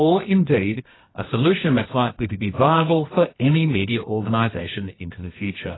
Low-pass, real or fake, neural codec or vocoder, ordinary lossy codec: 7.2 kHz; fake; codec, 24 kHz, 0.9 kbps, WavTokenizer, medium music audio release; AAC, 16 kbps